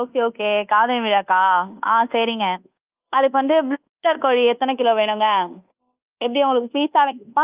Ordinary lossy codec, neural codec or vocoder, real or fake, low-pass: Opus, 24 kbps; codec, 24 kHz, 1.2 kbps, DualCodec; fake; 3.6 kHz